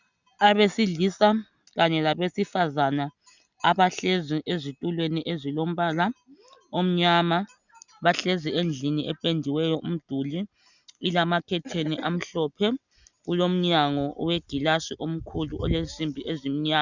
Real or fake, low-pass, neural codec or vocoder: real; 7.2 kHz; none